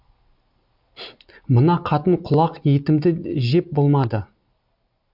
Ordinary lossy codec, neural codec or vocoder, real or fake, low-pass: MP3, 48 kbps; none; real; 5.4 kHz